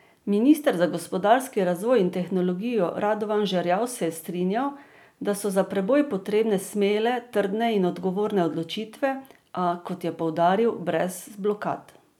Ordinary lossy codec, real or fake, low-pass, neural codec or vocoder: none; real; 19.8 kHz; none